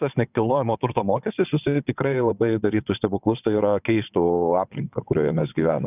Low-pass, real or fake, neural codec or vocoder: 3.6 kHz; fake; vocoder, 22.05 kHz, 80 mel bands, WaveNeXt